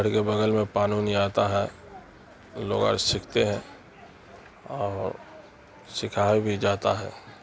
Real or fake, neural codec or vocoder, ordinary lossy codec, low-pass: real; none; none; none